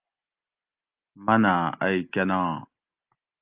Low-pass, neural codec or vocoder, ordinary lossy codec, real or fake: 3.6 kHz; none; Opus, 32 kbps; real